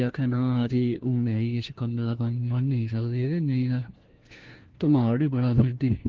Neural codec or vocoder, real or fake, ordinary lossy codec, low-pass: codec, 16 kHz, 1 kbps, FunCodec, trained on LibriTTS, 50 frames a second; fake; Opus, 16 kbps; 7.2 kHz